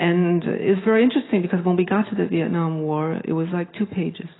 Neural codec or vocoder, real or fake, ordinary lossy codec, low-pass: none; real; AAC, 16 kbps; 7.2 kHz